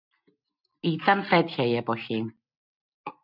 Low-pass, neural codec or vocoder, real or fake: 5.4 kHz; none; real